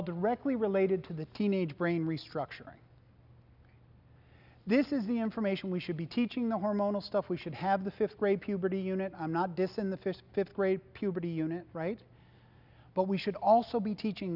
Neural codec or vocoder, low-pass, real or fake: none; 5.4 kHz; real